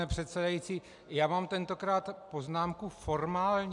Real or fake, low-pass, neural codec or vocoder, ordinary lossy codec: real; 10.8 kHz; none; MP3, 96 kbps